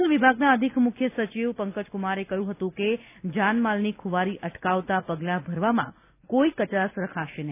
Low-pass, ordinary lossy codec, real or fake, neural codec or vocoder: 3.6 kHz; none; real; none